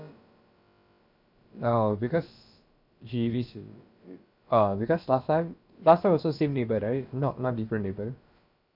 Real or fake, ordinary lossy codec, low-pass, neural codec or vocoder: fake; none; 5.4 kHz; codec, 16 kHz, about 1 kbps, DyCAST, with the encoder's durations